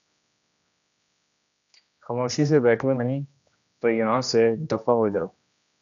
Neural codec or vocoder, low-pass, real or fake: codec, 16 kHz, 1 kbps, X-Codec, HuBERT features, trained on general audio; 7.2 kHz; fake